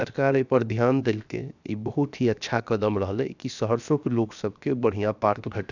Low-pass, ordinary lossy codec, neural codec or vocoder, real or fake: 7.2 kHz; none; codec, 16 kHz, 0.7 kbps, FocalCodec; fake